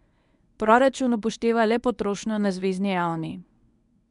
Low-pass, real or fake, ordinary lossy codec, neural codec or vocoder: 10.8 kHz; fake; none; codec, 24 kHz, 0.9 kbps, WavTokenizer, medium speech release version 1